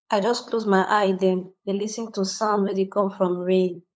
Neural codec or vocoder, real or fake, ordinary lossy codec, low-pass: codec, 16 kHz, 8 kbps, FunCodec, trained on LibriTTS, 25 frames a second; fake; none; none